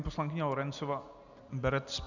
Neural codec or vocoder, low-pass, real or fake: none; 7.2 kHz; real